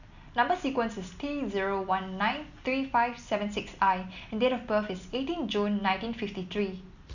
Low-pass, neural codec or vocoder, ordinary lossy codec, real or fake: 7.2 kHz; none; none; real